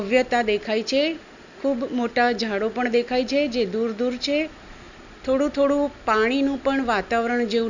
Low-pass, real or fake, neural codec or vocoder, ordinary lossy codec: 7.2 kHz; real; none; none